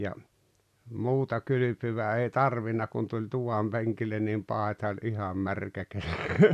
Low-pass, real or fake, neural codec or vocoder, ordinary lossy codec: 14.4 kHz; real; none; none